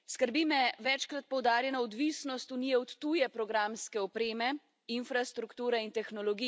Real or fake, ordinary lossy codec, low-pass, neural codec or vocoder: real; none; none; none